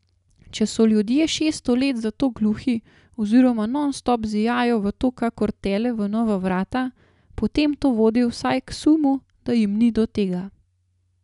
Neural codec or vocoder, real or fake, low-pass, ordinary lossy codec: none; real; 10.8 kHz; none